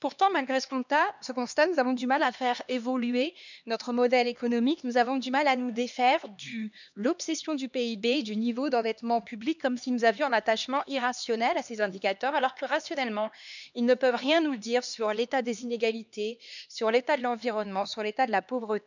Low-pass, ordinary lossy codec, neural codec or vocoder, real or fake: 7.2 kHz; none; codec, 16 kHz, 2 kbps, X-Codec, HuBERT features, trained on LibriSpeech; fake